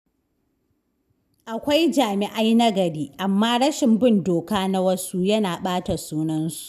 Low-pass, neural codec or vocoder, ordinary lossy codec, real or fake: 14.4 kHz; none; none; real